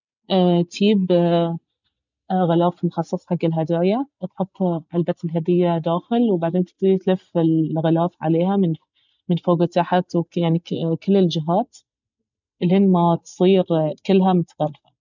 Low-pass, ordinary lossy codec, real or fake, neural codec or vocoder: 7.2 kHz; none; real; none